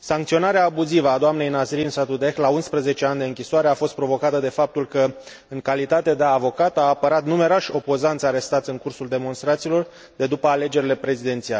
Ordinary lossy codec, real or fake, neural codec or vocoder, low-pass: none; real; none; none